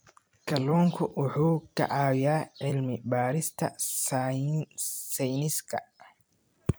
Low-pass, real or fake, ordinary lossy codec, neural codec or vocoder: none; fake; none; vocoder, 44.1 kHz, 128 mel bands every 256 samples, BigVGAN v2